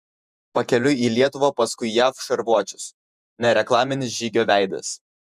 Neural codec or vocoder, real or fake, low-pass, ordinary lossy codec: vocoder, 48 kHz, 128 mel bands, Vocos; fake; 14.4 kHz; MP3, 96 kbps